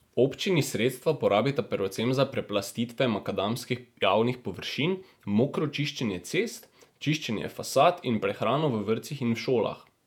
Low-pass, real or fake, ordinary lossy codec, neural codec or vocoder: 19.8 kHz; fake; none; vocoder, 48 kHz, 128 mel bands, Vocos